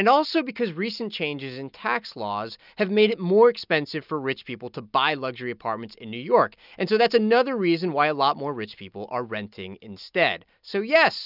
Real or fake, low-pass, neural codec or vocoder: real; 5.4 kHz; none